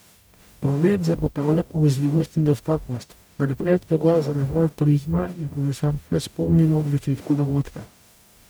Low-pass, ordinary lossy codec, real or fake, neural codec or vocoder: none; none; fake; codec, 44.1 kHz, 0.9 kbps, DAC